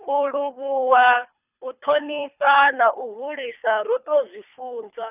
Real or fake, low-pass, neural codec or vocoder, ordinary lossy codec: fake; 3.6 kHz; codec, 24 kHz, 3 kbps, HILCodec; none